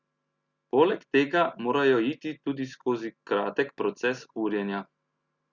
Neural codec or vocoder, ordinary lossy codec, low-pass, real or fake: none; Opus, 64 kbps; 7.2 kHz; real